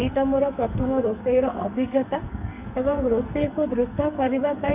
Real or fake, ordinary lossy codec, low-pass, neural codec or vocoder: fake; none; 3.6 kHz; codec, 44.1 kHz, 2.6 kbps, SNAC